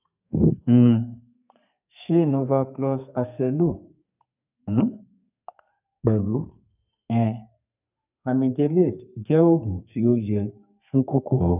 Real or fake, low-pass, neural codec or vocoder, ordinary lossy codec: fake; 3.6 kHz; codec, 32 kHz, 1.9 kbps, SNAC; none